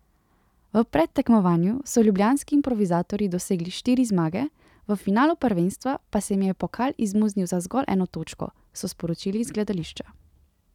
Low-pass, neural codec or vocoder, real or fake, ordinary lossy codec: 19.8 kHz; none; real; none